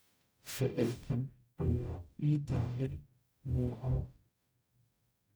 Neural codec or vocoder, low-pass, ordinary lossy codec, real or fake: codec, 44.1 kHz, 0.9 kbps, DAC; none; none; fake